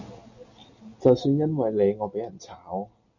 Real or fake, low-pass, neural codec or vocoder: real; 7.2 kHz; none